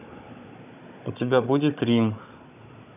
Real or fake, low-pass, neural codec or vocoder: fake; 3.6 kHz; codec, 16 kHz, 16 kbps, FunCodec, trained on Chinese and English, 50 frames a second